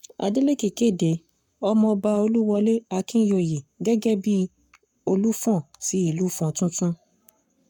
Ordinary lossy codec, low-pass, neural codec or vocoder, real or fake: none; 19.8 kHz; codec, 44.1 kHz, 7.8 kbps, Pupu-Codec; fake